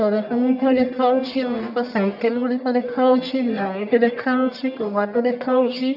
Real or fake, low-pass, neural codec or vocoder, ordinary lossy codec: fake; 5.4 kHz; codec, 44.1 kHz, 1.7 kbps, Pupu-Codec; none